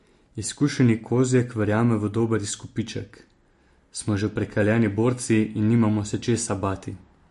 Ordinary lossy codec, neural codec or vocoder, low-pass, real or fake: MP3, 48 kbps; none; 14.4 kHz; real